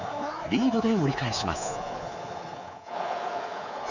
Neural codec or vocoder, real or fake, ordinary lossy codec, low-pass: codec, 24 kHz, 3.1 kbps, DualCodec; fake; none; 7.2 kHz